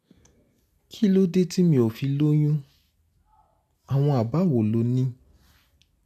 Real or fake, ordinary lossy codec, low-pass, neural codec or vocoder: real; none; 14.4 kHz; none